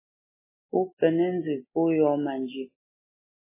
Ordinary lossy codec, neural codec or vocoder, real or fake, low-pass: MP3, 16 kbps; none; real; 3.6 kHz